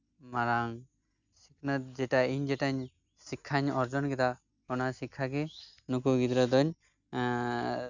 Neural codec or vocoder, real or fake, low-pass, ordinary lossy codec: none; real; 7.2 kHz; none